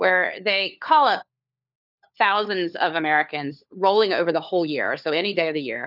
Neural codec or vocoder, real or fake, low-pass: codec, 16 kHz, 4 kbps, FunCodec, trained on LibriTTS, 50 frames a second; fake; 5.4 kHz